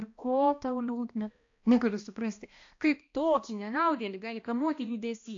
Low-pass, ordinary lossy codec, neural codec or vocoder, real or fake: 7.2 kHz; AAC, 48 kbps; codec, 16 kHz, 1 kbps, X-Codec, HuBERT features, trained on balanced general audio; fake